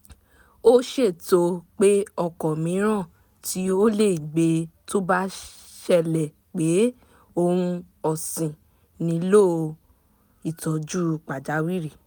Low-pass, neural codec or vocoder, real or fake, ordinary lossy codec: none; none; real; none